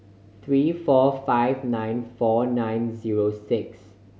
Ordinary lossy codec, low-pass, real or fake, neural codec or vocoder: none; none; real; none